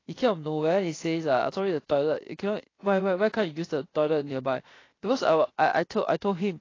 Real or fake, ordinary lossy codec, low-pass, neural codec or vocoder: fake; AAC, 32 kbps; 7.2 kHz; codec, 16 kHz, 0.7 kbps, FocalCodec